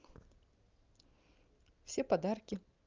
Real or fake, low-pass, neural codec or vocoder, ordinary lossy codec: real; 7.2 kHz; none; Opus, 24 kbps